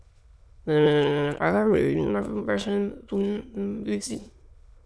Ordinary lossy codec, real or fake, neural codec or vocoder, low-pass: none; fake; autoencoder, 22.05 kHz, a latent of 192 numbers a frame, VITS, trained on many speakers; none